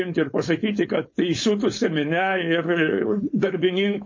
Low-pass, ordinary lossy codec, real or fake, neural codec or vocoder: 7.2 kHz; MP3, 32 kbps; fake; codec, 16 kHz, 4.8 kbps, FACodec